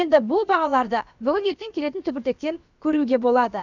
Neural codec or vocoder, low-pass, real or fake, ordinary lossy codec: codec, 16 kHz, about 1 kbps, DyCAST, with the encoder's durations; 7.2 kHz; fake; none